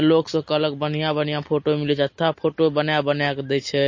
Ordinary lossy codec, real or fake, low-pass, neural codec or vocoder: MP3, 32 kbps; real; 7.2 kHz; none